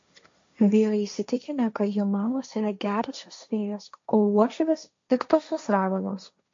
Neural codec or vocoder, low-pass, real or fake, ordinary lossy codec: codec, 16 kHz, 1.1 kbps, Voila-Tokenizer; 7.2 kHz; fake; MP3, 48 kbps